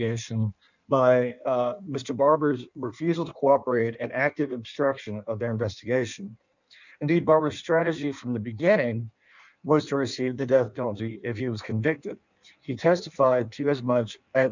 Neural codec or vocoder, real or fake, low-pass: codec, 16 kHz in and 24 kHz out, 1.1 kbps, FireRedTTS-2 codec; fake; 7.2 kHz